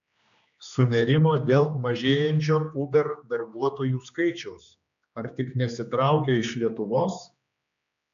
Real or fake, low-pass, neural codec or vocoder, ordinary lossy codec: fake; 7.2 kHz; codec, 16 kHz, 2 kbps, X-Codec, HuBERT features, trained on general audio; AAC, 64 kbps